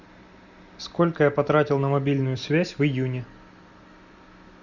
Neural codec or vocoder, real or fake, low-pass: none; real; 7.2 kHz